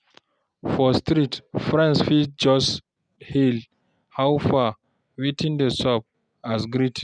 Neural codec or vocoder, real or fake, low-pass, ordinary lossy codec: none; real; 9.9 kHz; none